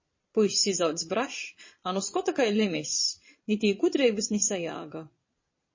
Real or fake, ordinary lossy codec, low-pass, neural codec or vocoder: fake; MP3, 32 kbps; 7.2 kHz; vocoder, 22.05 kHz, 80 mel bands, Vocos